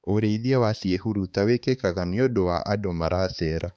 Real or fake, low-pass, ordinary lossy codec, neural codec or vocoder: fake; none; none; codec, 16 kHz, 4 kbps, X-Codec, HuBERT features, trained on balanced general audio